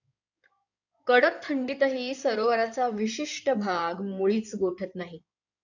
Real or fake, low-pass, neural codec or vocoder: fake; 7.2 kHz; codec, 16 kHz in and 24 kHz out, 2.2 kbps, FireRedTTS-2 codec